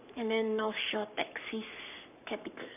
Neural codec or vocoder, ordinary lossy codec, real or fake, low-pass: codec, 44.1 kHz, 7.8 kbps, Pupu-Codec; none; fake; 3.6 kHz